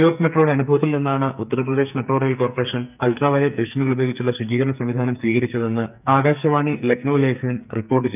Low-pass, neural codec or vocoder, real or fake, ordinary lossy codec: 3.6 kHz; codec, 44.1 kHz, 2.6 kbps, SNAC; fake; none